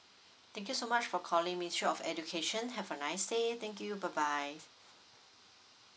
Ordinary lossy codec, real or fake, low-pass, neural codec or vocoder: none; real; none; none